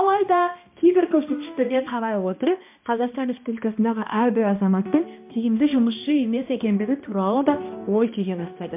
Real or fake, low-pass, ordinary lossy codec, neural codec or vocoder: fake; 3.6 kHz; MP3, 32 kbps; codec, 16 kHz, 1 kbps, X-Codec, HuBERT features, trained on balanced general audio